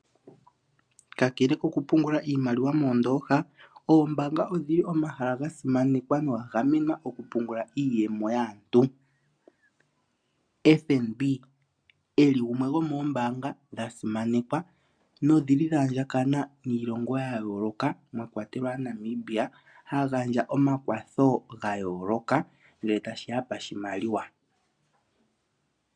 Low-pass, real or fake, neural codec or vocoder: 9.9 kHz; real; none